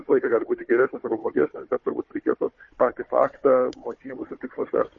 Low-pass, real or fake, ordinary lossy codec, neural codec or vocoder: 7.2 kHz; fake; MP3, 32 kbps; codec, 16 kHz, 4 kbps, FunCodec, trained on Chinese and English, 50 frames a second